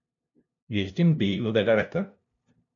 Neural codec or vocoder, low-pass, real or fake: codec, 16 kHz, 0.5 kbps, FunCodec, trained on LibriTTS, 25 frames a second; 7.2 kHz; fake